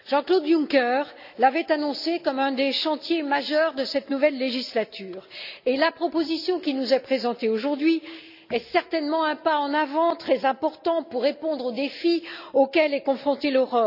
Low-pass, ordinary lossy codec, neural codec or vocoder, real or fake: 5.4 kHz; none; none; real